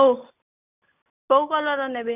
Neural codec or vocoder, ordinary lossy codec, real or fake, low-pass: none; none; real; 3.6 kHz